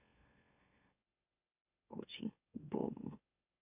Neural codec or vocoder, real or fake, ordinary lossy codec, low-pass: autoencoder, 44.1 kHz, a latent of 192 numbers a frame, MeloTTS; fake; none; 3.6 kHz